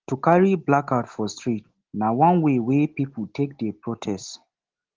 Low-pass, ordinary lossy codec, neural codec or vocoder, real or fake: 7.2 kHz; Opus, 16 kbps; none; real